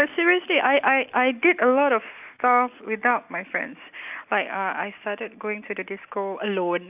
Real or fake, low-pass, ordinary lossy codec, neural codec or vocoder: real; 3.6 kHz; none; none